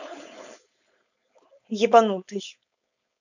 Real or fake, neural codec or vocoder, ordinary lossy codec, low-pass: fake; codec, 16 kHz, 4.8 kbps, FACodec; none; 7.2 kHz